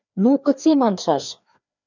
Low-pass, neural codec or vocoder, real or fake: 7.2 kHz; codec, 16 kHz, 2 kbps, FreqCodec, larger model; fake